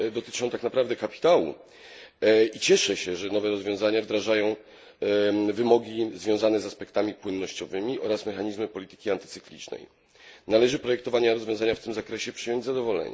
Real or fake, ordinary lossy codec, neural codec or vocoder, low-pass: real; none; none; none